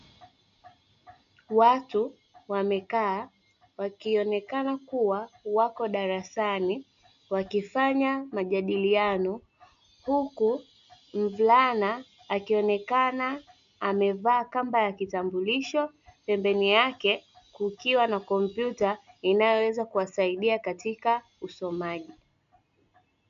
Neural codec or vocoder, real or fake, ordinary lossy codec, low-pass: none; real; MP3, 64 kbps; 7.2 kHz